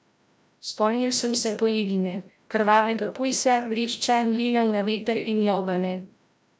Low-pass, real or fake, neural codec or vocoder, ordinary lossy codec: none; fake; codec, 16 kHz, 0.5 kbps, FreqCodec, larger model; none